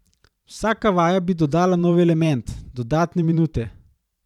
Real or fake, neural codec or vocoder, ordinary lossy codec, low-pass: fake; vocoder, 44.1 kHz, 128 mel bands every 512 samples, BigVGAN v2; none; 19.8 kHz